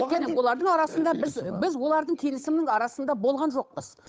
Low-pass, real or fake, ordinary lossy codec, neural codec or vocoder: none; fake; none; codec, 16 kHz, 8 kbps, FunCodec, trained on Chinese and English, 25 frames a second